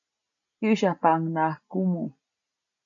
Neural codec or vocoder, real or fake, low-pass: none; real; 7.2 kHz